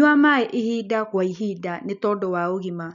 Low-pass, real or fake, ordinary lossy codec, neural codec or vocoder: 7.2 kHz; real; none; none